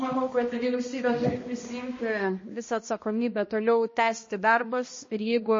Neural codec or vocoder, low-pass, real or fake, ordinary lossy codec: codec, 16 kHz, 1 kbps, X-Codec, HuBERT features, trained on balanced general audio; 7.2 kHz; fake; MP3, 32 kbps